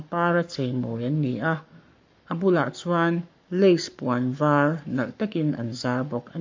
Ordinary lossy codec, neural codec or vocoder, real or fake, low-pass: MP3, 48 kbps; codec, 44.1 kHz, 7.8 kbps, Pupu-Codec; fake; 7.2 kHz